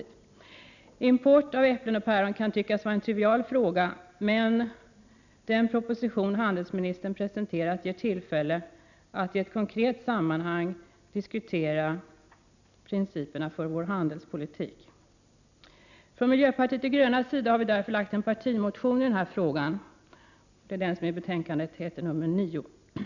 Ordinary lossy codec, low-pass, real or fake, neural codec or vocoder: none; 7.2 kHz; real; none